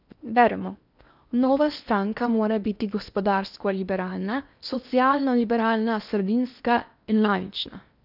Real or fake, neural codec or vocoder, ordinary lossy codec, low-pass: fake; codec, 16 kHz in and 24 kHz out, 0.8 kbps, FocalCodec, streaming, 65536 codes; none; 5.4 kHz